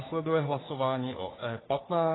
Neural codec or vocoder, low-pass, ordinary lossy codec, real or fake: codec, 44.1 kHz, 3.4 kbps, Pupu-Codec; 7.2 kHz; AAC, 16 kbps; fake